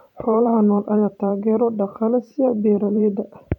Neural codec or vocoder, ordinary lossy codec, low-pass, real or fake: vocoder, 44.1 kHz, 128 mel bands every 512 samples, BigVGAN v2; none; 19.8 kHz; fake